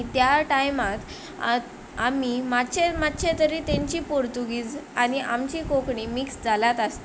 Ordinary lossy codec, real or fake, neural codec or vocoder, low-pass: none; real; none; none